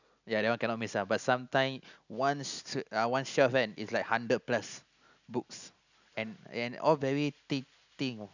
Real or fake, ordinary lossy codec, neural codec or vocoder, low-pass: real; none; none; 7.2 kHz